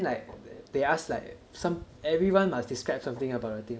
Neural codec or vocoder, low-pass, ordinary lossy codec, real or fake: none; none; none; real